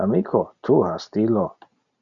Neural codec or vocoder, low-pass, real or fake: none; 7.2 kHz; real